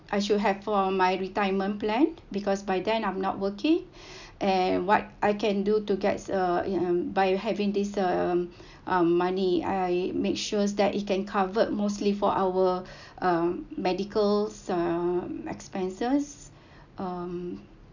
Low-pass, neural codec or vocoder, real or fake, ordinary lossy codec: 7.2 kHz; none; real; none